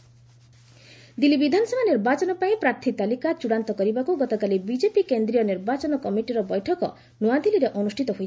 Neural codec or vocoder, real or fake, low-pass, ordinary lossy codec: none; real; none; none